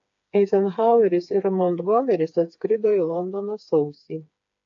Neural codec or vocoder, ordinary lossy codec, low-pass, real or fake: codec, 16 kHz, 4 kbps, FreqCodec, smaller model; AAC, 48 kbps; 7.2 kHz; fake